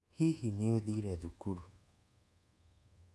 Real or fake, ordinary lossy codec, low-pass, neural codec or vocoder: fake; none; none; codec, 24 kHz, 1.2 kbps, DualCodec